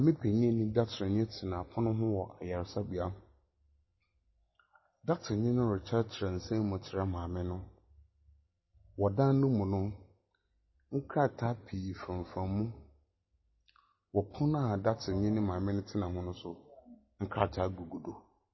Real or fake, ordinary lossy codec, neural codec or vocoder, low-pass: real; MP3, 24 kbps; none; 7.2 kHz